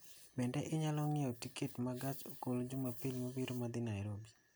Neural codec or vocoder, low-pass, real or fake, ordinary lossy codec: none; none; real; none